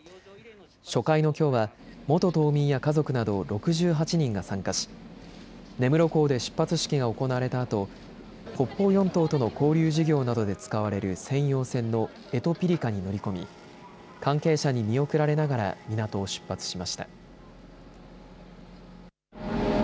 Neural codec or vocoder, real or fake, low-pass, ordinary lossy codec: none; real; none; none